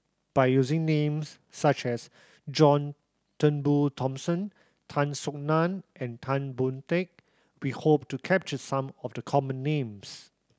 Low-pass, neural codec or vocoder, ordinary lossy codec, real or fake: none; none; none; real